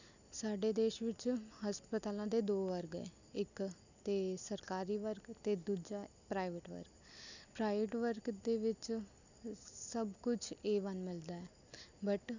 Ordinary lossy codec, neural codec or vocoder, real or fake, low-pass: none; none; real; 7.2 kHz